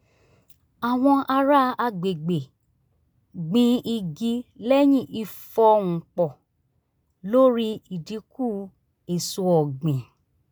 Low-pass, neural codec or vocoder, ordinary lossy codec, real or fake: none; none; none; real